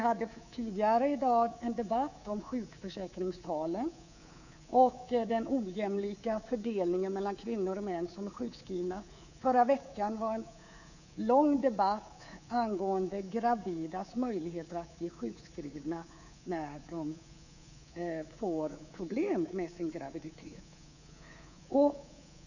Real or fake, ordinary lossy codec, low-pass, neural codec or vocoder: fake; none; 7.2 kHz; codec, 24 kHz, 3.1 kbps, DualCodec